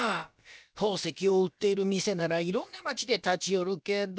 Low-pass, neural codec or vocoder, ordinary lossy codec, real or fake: none; codec, 16 kHz, about 1 kbps, DyCAST, with the encoder's durations; none; fake